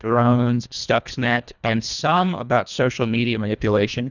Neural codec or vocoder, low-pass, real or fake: codec, 24 kHz, 1.5 kbps, HILCodec; 7.2 kHz; fake